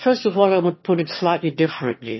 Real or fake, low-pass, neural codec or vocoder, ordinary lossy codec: fake; 7.2 kHz; autoencoder, 22.05 kHz, a latent of 192 numbers a frame, VITS, trained on one speaker; MP3, 24 kbps